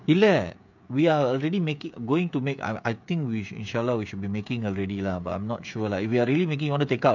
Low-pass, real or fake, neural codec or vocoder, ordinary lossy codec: 7.2 kHz; fake; codec, 16 kHz, 16 kbps, FreqCodec, smaller model; none